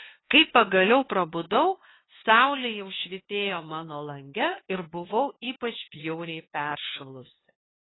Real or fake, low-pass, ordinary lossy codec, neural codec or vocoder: fake; 7.2 kHz; AAC, 16 kbps; codec, 16 kHz, 2 kbps, FunCodec, trained on LibriTTS, 25 frames a second